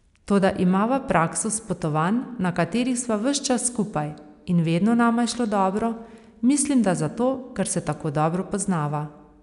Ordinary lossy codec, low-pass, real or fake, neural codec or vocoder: none; 10.8 kHz; real; none